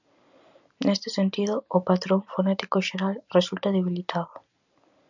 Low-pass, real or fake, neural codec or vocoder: 7.2 kHz; real; none